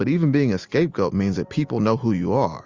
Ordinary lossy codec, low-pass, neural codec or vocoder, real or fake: Opus, 32 kbps; 7.2 kHz; none; real